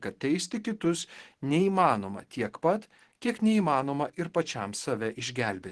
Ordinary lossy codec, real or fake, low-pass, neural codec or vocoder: Opus, 16 kbps; fake; 10.8 kHz; vocoder, 44.1 kHz, 128 mel bands every 512 samples, BigVGAN v2